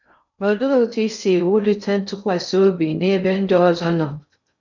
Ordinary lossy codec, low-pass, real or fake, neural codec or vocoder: none; 7.2 kHz; fake; codec, 16 kHz in and 24 kHz out, 0.6 kbps, FocalCodec, streaming, 2048 codes